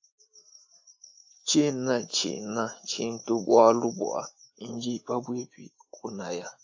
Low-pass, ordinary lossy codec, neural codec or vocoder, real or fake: 7.2 kHz; AAC, 48 kbps; autoencoder, 48 kHz, 128 numbers a frame, DAC-VAE, trained on Japanese speech; fake